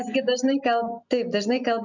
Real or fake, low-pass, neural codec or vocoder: real; 7.2 kHz; none